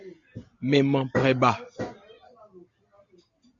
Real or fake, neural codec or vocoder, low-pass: real; none; 7.2 kHz